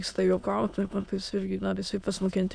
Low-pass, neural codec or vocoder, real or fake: 9.9 kHz; autoencoder, 22.05 kHz, a latent of 192 numbers a frame, VITS, trained on many speakers; fake